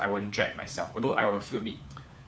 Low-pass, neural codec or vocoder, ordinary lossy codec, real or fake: none; codec, 16 kHz, 4 kbps, FunCodec, trained on LibriTTS, 50 frames a second; none; fake